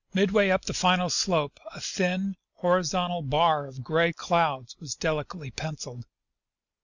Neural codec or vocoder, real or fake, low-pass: none; real; 7.2 kHz